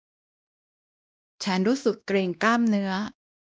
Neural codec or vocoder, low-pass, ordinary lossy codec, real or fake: codec, 16 kHz, 1 kbps, X-Codec, WavLM features, trained on Multilingual LibriSpeech; none; none; fake